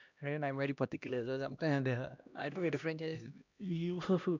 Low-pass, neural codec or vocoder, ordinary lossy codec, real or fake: 7.2 kHz; codec, 16 kHz, 1 kbps, X-Codec, HuBERT features, trained on LibriSpeech; none; fake